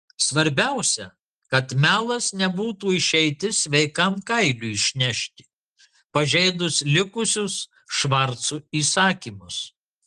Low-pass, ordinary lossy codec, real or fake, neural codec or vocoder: 10.8 kHz; Opus, 24 kbps; real; none